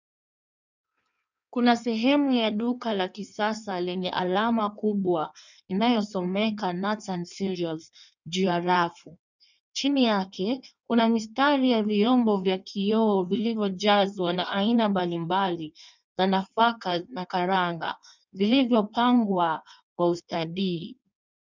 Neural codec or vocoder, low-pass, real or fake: codec, 16 kHz in and 24 kHz out, 1.1 kbps, FireRedTTS-2 codec; 7.2 kHz; fake